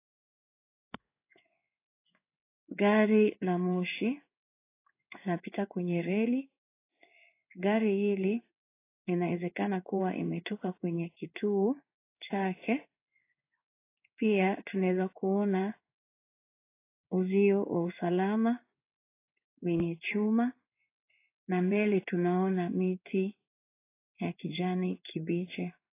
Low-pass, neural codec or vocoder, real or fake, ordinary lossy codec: 3.6 kHz; codec, 16 kHz in and 24 kHz out, 1 kbps, XY-Tokenizer; fake; AAC, 24 kbps